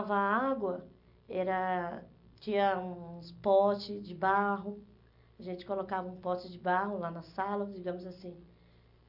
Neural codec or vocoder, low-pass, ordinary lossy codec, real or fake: none; 5.4 kHz; none; real